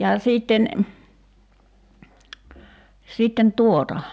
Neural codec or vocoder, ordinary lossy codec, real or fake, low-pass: none; none; real; none